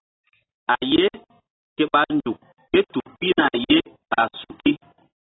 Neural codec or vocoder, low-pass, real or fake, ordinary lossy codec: none; 7.2 kHz; real; AAC, 16 kbps